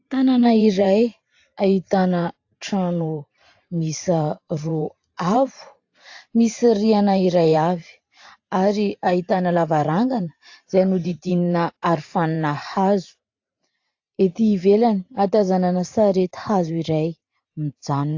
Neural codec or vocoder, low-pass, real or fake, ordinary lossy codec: vocoder, 44.1 kHz, 128 mel bands every 512 samples, BigVGAN v2; 7.2 kHz; fake; AAC, 48 kbps